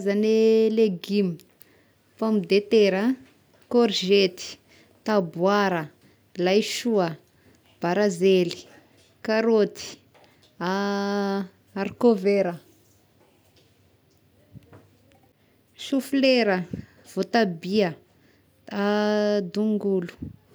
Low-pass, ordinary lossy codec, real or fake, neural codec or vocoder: none; none; real; none